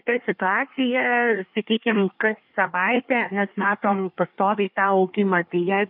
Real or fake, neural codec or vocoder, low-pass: fake; codec, 24 kHz, 1 kbps, SNAC; 5.4 kHz